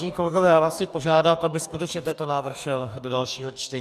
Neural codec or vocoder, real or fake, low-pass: codec, 44.1 kHz, 2.6 kbps, DAC; fake; 14.4 kHz